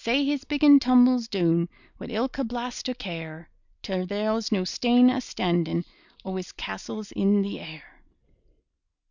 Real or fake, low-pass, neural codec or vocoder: real; 7.2 kHz; none